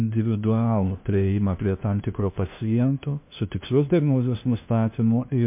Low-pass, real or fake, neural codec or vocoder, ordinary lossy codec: 3.6 kHz; fake; codec, 16 kHz, 1 kbps, FunCodec, trained on LibriTTS, 50 frames a second; MP3, 32 kbps